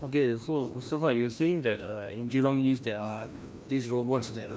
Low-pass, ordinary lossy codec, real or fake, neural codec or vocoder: none; none; fake; codec, 16 kHz, 1 kbps, FreqCodec, larger model